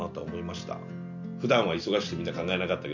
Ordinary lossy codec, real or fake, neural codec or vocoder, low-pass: none; real; none; 7.2 kHz